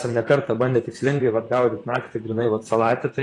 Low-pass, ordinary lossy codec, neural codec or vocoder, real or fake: 10.8 kHz; AAC, 32 kbps; vocoder, 44.1 kHz, 128 mel bands, Pupu-Vocoder; fake